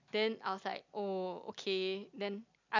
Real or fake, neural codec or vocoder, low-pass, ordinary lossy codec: real; none; 7.2 kHz; MP3, 64 kbps